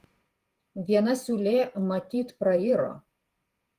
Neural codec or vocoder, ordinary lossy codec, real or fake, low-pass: vocoder, 48 kHz, 128 mel bands, Vocos; Opus, 24 kbps; fake; 14.4 kHz